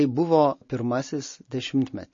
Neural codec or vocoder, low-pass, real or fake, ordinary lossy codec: none; 7.2 kHz; real; MP3, 32 kbps